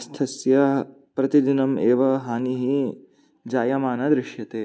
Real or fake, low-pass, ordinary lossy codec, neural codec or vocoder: real; none; none; none